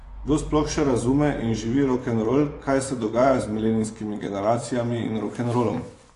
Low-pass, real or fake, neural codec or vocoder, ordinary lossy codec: 10.8 kHz; fake; vocoder, 24 kHz, 100 mel bands, Vocos; AAC, 48 kbps